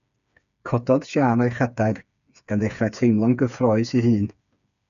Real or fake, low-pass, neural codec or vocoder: fake; 7.2 kHz; codec, 16 kHz, 4 kbps, FreqCodec, smaller model